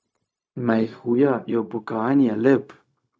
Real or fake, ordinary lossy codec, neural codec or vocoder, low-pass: fake; none; codec, 16 kHz, 0.4 kbps, LongCat-Audio-Codec; none